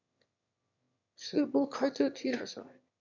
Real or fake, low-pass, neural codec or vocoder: fake; 7.2 kHz; autoencoder, 22.05 kHz, a latent of 192 numbers a frame, VITS, trained on one speaker